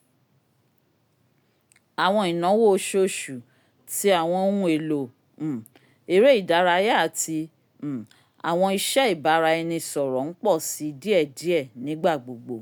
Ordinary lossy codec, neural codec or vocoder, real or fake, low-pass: none; none; real; none